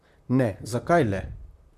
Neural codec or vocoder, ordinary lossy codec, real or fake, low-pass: vocoder, 44.1 kHz, 128 mel bands, Pupu-Vocoder; none; fake; 14.4 kHz